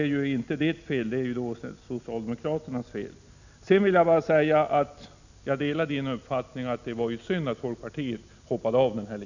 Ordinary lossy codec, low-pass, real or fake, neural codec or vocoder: none; 7.2 kHz; real; none